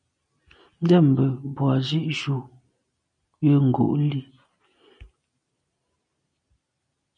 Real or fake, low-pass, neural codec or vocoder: real; 9.9 kHz; none